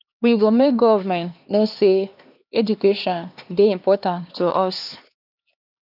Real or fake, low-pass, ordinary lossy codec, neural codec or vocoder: fake; 5.4 kHz; none; codec, 16 kHz, 2 kbps, X-Codec, HuBERT features, trained on LibriSpeech